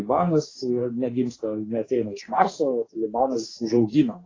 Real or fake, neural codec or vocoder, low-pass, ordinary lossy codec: fake; codec, 44.1 kHz, 2.6 kbps, DAC; 7.2 kHz; AAC, 32 kbps